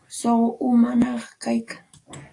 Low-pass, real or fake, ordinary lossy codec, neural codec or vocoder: 10.8 kHz; fake; AAC, 48 kbps; codec, 44.1 kHz, 7.8 kbps, DAC